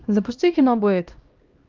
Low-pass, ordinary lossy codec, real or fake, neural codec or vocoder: 7.2 kHz; Opus, 32 kbps; fake; codec, 16 kHz, 1 kbps, X-Codec, HuBERT features, trained on LibriSpeech